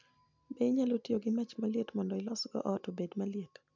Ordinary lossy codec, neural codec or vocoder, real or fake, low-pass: MP3, 64 kbps; none; real; 7.2 kHz